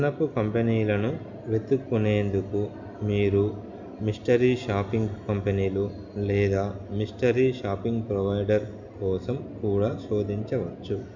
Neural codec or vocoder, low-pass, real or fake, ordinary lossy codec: none; 7.2 kHz; real; none